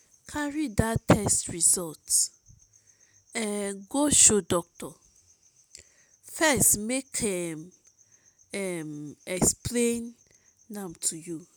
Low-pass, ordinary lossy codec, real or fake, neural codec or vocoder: none; none; real; none